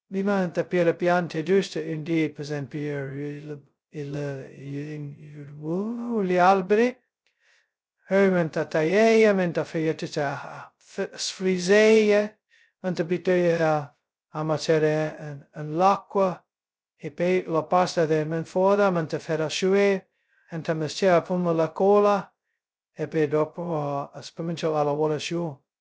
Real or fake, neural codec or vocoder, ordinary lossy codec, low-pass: fake; codec, 16 kHz, 0.2 kbps, FocalCodec; none; none